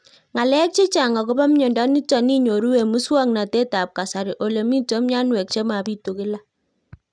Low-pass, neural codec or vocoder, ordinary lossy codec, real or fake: 9.9 kHz; none; none; real